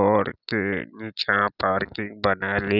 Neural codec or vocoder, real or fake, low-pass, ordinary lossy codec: none; real; 5.4 kHz; none